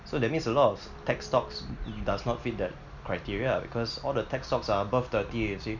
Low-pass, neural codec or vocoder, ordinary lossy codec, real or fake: 7.2 kHz; none; none; real